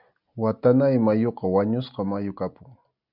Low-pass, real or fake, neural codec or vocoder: 5.4 kHz; real; none